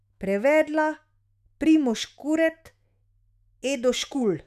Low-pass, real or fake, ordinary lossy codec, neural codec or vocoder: 14.4 kHz; fake; none; autoencoder, 48 kHz, 128 numbers a frame, DAC-VAE, trained on Japanese speech